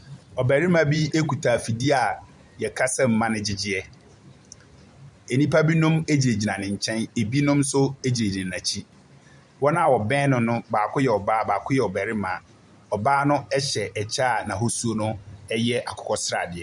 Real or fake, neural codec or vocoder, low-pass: fake; vocoder, 44.1 kHz, 128 mel bands every 512 samples, BigVGAN v2; 10.8 kHz